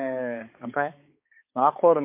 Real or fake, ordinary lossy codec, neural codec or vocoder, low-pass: fake; MP3, 24 kbps; codec, 16 kHz, 4 kbps, X-Codec, HuBERT features, trained on balanced general audio; 3.6 kHz